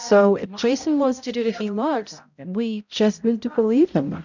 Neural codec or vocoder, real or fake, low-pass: codec, 16 kHz, 0.5 kbps, X-Codec, HuBERT features, trained on balanced general audio; fake; 7.2 kHz